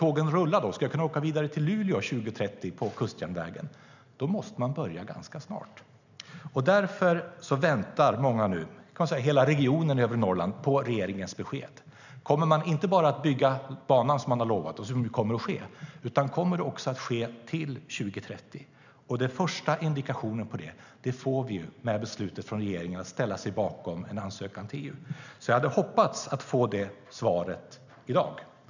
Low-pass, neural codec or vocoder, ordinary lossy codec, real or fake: 7.2 kHz; none; none; real